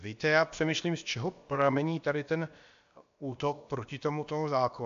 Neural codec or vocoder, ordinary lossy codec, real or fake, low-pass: codec, 16 kHz, about 1 kbps, DyCAST, with the encoder's durations; AAC, 64 kbps; fake; 7.2 kHz